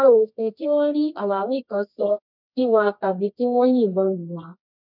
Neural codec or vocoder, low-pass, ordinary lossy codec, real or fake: codec, 24 kHz, 0.9 kbps, WavTokenizer, medium music audio release; 5.4 kHz; none; fake